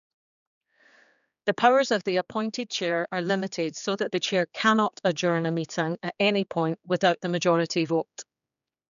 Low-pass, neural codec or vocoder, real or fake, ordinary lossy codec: 7.2 kHz; codec, 16 kHz, 4 kbps, X-Codec, HuBERT features, trained on general audio; fake; none